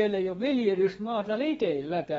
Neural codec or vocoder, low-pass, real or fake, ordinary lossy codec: codec, 32 kHz, 1.9 kbps, SNAC; 14.4 kHz; fake; AAC, 24 kbps